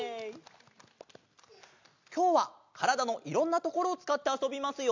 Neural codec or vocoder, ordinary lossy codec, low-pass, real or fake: none; MP3, 64 kbps; 7.2 kHz; real